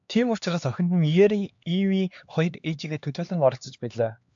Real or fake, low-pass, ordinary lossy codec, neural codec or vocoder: fake; 7.2 kHz; AAC, 64 kbps; codec, 16 kHz, 2 kbps, X-Codec, HuBERT features, trained on LibriSpeech